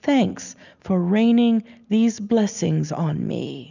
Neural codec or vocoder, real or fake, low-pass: none; real; 7.2 kHz